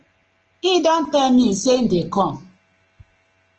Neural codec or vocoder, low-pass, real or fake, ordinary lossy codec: none; 7.2 kHz; real; Opus, 16 kbps